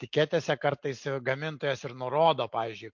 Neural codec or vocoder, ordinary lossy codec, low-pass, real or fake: none; MP3, 48 kbps; 7.2 kHz; real